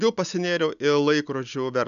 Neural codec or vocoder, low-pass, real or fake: none; 7.2 kHz; real